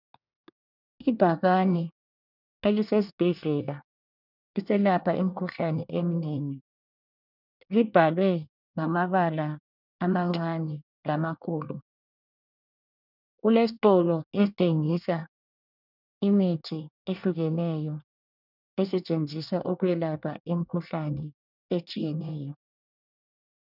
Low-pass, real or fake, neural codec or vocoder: 5.4 kHz; fake; codec, 24 kHz, 1 kbps, SNAC